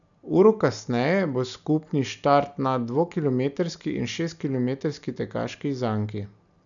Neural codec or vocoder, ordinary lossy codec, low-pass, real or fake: none; none; 7.2 kHz; real